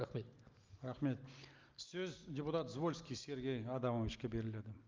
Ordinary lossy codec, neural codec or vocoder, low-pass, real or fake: Opus, 24 kbps; none; 7.2 kHz; real